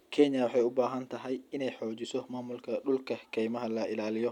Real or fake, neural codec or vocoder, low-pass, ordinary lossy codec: real; none; 19.8 kHz; none